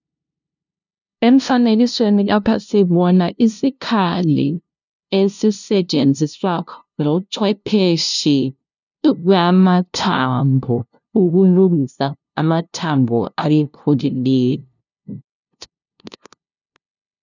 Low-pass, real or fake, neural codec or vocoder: 7.2 kHz; fake; codec, 16 kHz, 0.5 kbps, FunCodec, trained on LibriTTS, 25 frames a second